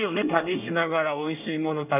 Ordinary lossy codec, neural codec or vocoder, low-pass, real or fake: none; codec, 24 kHz, 1 kbps, SNAC; 3.6 kHz; fake